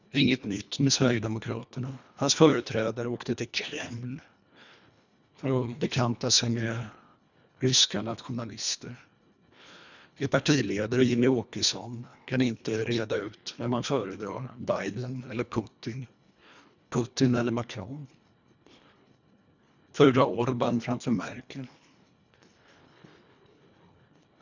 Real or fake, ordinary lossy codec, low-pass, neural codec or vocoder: fake; none; 7.2 kHz; codec, 24 kHz, 1.5 kbps, HILCodec